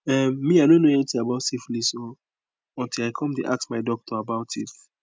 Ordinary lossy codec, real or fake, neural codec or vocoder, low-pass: none; real; none; none